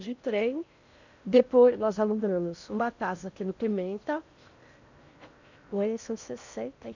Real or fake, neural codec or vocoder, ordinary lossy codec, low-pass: fake; codec, 16 kHz in and 24 kHz out, 0.6 kbps, FocalCodec, streaming, 4096 codes; none; 7.2 kHz